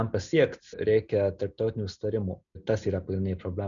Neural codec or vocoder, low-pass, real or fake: none; 7.2 kHz; real